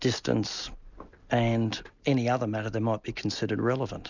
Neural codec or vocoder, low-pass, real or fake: none; 7.2 kHz; real